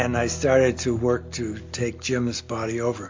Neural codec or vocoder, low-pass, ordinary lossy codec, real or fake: none; 7.2 kHz; MP3, 48 kbps; real